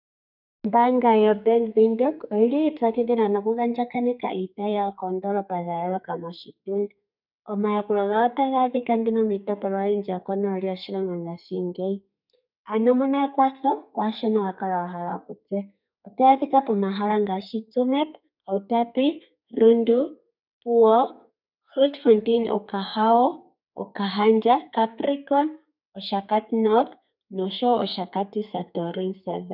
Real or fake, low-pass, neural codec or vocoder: fake; 5.4 kHz; codec, 32 kHz, 1.9 kbps, SNAC